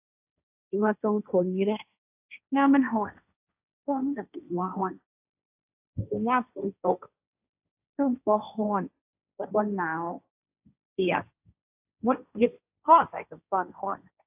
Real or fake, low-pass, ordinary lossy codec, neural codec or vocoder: fake; 3.6 kHz; AAC, 32 kbps; codec, 16 kHz, 1.1 kbps, Voila-Tokenizer